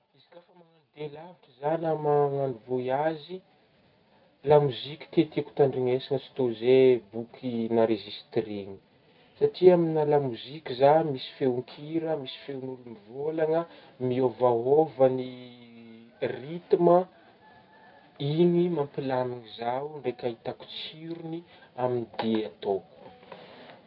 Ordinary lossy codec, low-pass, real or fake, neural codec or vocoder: none; 5.4 kHz; real; none